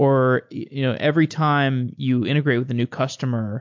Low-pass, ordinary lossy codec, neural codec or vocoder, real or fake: 7.2 kHz; MP3, 48 kbps; none; real